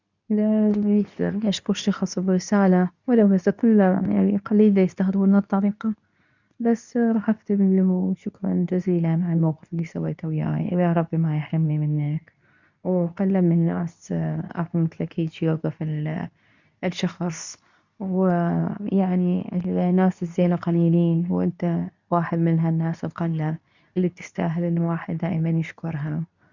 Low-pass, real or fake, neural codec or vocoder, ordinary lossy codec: 7.2 kHz; fake; codec, 24 kHz, 0.9 kbps, WavTokenizer, medium speech release version 2; none